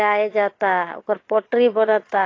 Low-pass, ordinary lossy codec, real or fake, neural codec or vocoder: 7.2 kHz; AAC, 32 kbps; fake; vocoder, 22.05 kHz, 80 mel bands, Vocos